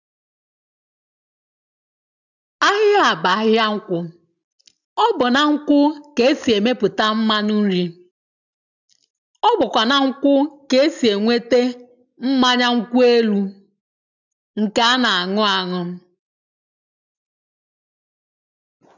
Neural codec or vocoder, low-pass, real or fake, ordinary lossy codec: none; 7.2 kHz; real; none